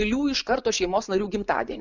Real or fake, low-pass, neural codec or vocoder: fake; 7.2 kHz; vocoder, 24 kHz, 100 mel bands, Vocos